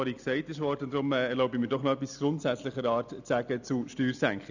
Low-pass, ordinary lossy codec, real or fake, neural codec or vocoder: 7.2 kHz; none; real; none